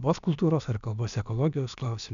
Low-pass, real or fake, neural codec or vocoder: 7.2 kHz; fake; codec, 16 kHz, about 1 kbps, DyCAST, with the encoder's durations